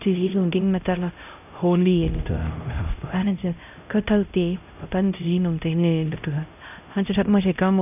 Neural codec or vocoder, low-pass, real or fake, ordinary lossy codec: codec, 16 kHz, 0.5 kbps, X-Codec, HuBERT features, trained on LibriSpeech; 3.6 kHz; fake; none